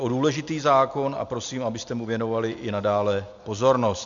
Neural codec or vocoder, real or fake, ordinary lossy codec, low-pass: none; real; MP3, 64 kbps; 7.2 kHz